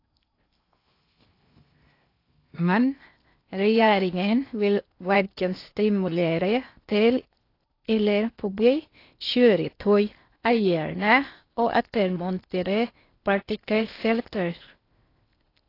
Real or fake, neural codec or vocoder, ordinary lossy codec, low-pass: fake; codec, 16 kHz in and 24 kHz out, 0.6 kbps, FocalCodec, streaming, 2048 codes; AAC, 32 kbps; 5.4 kHz